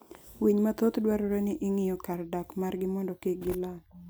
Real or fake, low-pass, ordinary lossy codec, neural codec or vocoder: real; none; none; none